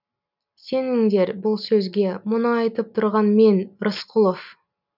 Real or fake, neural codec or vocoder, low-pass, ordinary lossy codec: real; none; 5.4 kHz; none